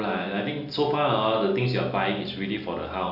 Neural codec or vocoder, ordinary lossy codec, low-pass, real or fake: none; none; 5.4 kHz; real